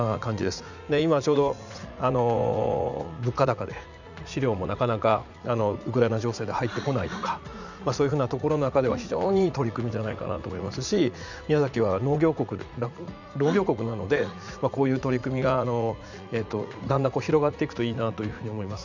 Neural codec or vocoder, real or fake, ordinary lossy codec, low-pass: vocoder, 44.1 kHz, 80 mel bands, Vocos; fake; none; 7.2 kHz